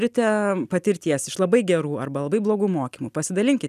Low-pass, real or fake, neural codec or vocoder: 14.4 kHz; real; none